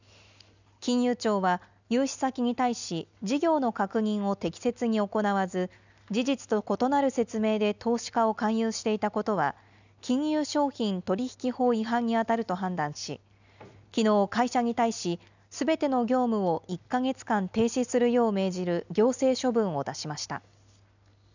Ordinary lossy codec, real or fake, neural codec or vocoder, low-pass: none; real; none; 7.2 kHz